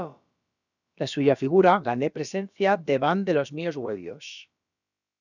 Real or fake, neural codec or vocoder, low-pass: fake; codec, 16 kHz, about 1 kbps, DyCAST, with the encoder's durations; 7.2 kHz